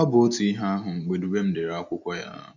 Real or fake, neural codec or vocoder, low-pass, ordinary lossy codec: real; none; 7.2 kHz; none